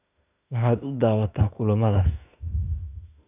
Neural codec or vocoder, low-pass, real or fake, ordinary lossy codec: autoencoder, 48 kHz, 32 numbers a frame, DAC-VAE, trained on Japanese speech; 3.6 kHz; fake; none